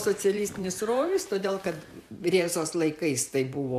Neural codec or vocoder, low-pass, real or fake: vocoder, 44.1 kHz, 128 mel bands every 512 samples, BigVGAN v2; 14.4 kHz; fake